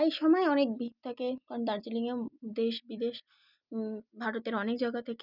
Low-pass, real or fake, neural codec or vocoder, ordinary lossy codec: 5.4 kHz; real; none; none